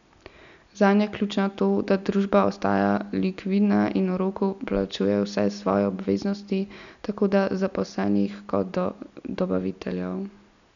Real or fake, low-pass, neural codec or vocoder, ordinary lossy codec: real; 7.2 kHz; none; none